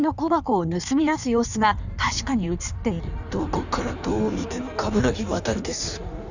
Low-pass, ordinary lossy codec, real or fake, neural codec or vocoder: 7.2 kHz; none; fake; codec, 16 kHz in and 24 kHz out, 1.1 kbps, FireRedTTS-2 codec